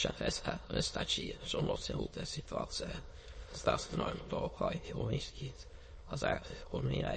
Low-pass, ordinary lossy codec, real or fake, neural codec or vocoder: 9.9 kHz; MP3, 32 kbps; fake; autoencoder, 22.05 kHz, a latent of 192 numbers a frame, VITS, trained on many speakers